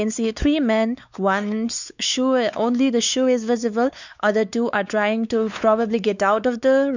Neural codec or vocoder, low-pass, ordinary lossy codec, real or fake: codec, 16 kHz, 4 kbps, X-Codec, WavLM features, trained on Multilingual LibriSpeech; 7.2 kHz; none; fake